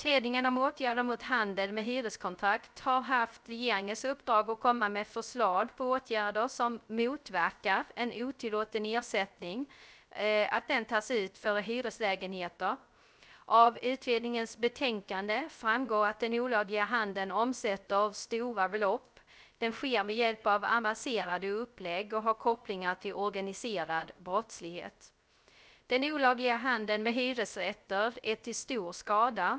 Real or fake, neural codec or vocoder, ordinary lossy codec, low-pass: fake; codec, 16 kHz, 0.3 kbps, FocalCodec; none; none